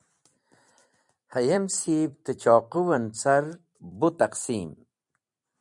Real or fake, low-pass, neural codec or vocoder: fake; 10.8 kHz; vocoder, 44.1 kHz, 128 mel bands every 256 samples, BigVGAN v2